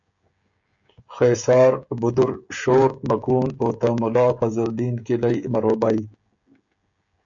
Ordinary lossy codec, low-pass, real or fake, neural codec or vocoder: MP3, 64 kbps; 7.2 kHz; fake; codec, 16 kHz, 16 kbps, FreqCodec, smaller model